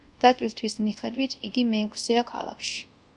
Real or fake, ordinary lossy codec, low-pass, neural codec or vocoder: fake; Opus, 64 kbps; 10.8 kHz; codec, 24 kHz, 0.5 kbps, DualCodec